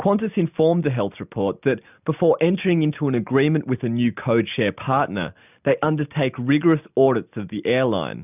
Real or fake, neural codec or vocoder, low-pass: real; none; 3.6 kHz